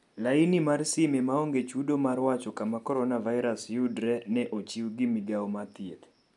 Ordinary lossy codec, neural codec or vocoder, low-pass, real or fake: none; none; 10.8 kHz; real